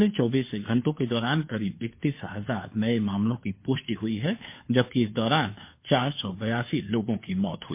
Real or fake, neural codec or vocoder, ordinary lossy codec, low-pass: fake; codec, 16 kHz, 2 kbps, FunCodec, trained on Chinese and English, 25 frames a second; MP3, 24 kbps; 3.6 kHz